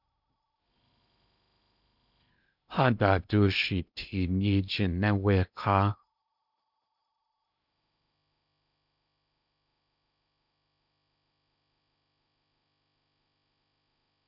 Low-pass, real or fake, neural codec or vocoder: 5.4 kHz; fake; codec, 16 kHz in and 24 kHz out, 0.8 kbps, FocalCodec, streaming, 65536 codes